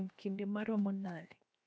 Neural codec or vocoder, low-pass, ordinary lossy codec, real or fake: codec, 16 kHz, 0.7 kbps, FocalCodec; none; none; fake